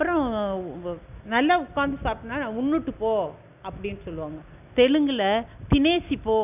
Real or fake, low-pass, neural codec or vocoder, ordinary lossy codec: real; 3.6 kHz; none; none